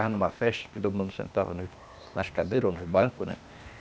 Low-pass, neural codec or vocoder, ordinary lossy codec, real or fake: none; codec, 16 kHz, 0.8 kbps, ZipCodec; none; fake